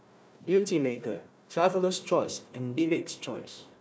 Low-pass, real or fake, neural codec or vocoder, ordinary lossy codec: none; fake; codec, 16 kHz, 1 kbps, FunCodec, trained on Chinese and English, 50 frames a second; none